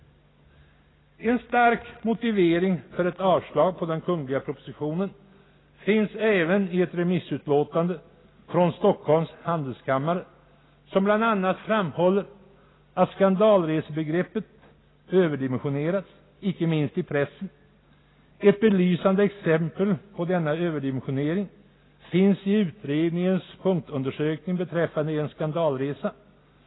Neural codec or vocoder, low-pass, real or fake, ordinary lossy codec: none; 7.2 kHz; real; AAC, 16 kbps